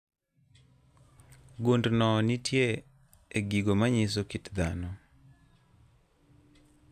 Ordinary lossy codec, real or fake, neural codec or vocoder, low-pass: none; real; none; 14.4 kHz